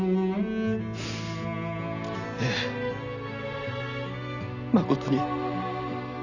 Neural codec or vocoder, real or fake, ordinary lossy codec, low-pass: none; real; none; 7.2 kHz